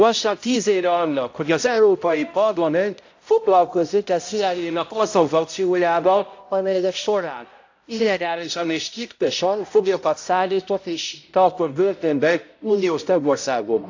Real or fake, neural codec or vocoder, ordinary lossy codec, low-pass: fake; codec, 16 kHz, 0.5 kbps, X-Codec, HuBERT features, trained on balanced general audio; AAC, 48 kbps; 7.2 kHz